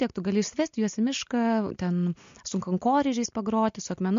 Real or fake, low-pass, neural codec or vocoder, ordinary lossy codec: real; 7.2 kHz; none; MP3, 48 kbps